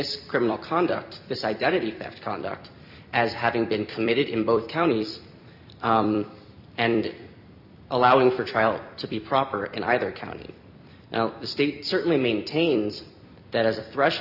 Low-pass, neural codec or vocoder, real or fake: 5.4 kHz; vocoder, 44.1 kHz, 128 mel bands every 256 samples, BigVGAN v2; fake